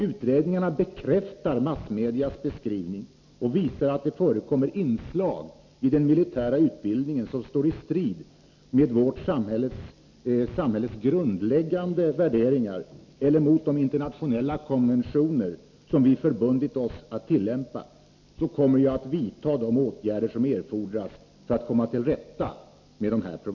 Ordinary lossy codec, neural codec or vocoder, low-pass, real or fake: MP3, 48 kbps; none; 7.2 kHz; real